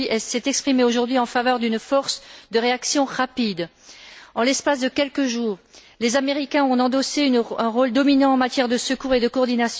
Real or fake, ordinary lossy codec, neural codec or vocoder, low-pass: real; none; none; none